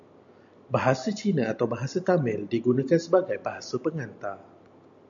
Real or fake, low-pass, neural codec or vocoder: real; 7.2 kHz; none